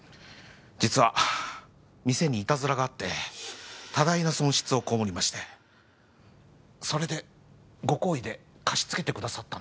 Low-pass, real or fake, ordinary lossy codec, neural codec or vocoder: none; real; none; none